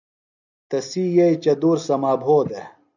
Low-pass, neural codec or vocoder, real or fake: 7.2 kHz; none; real